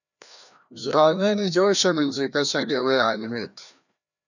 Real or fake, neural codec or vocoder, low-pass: fake; codec, 16 kHz, 1 kbps, FreqCodec, larger model; 7.2 kHz